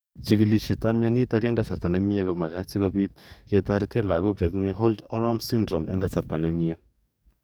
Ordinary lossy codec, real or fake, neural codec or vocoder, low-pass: none; fake; codec, 44.1 kHz, 2.6 kbps, DAC; none